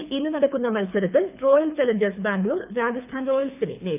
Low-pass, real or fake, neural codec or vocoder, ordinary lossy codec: 3.6 kHz; fake; codec, 24 kHz, 6 kbps, HILCodec; none